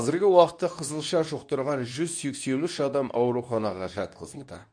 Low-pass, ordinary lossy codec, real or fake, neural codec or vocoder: 9.9 kHz; none; fake; codec, 24 kHz, 0.9 kbps, WavTokenizer, medium speech release version 2